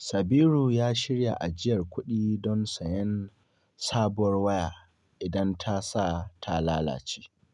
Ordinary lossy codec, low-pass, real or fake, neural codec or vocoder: none; 10.8 kHz; real; none